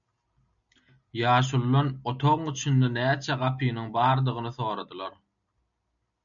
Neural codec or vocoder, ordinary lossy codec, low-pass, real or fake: none; MP3, 96 kbps; 7.2 kHz; real